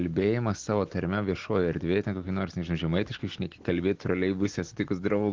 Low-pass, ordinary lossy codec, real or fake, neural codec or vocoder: 7.2 kHz; Opus, 16 kbps; real; none